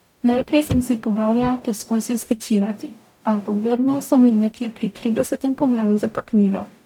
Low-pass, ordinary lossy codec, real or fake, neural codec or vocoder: 19.8 kHz; none; fake; codec, 44.1 kHz, 0.9 kbps, DAC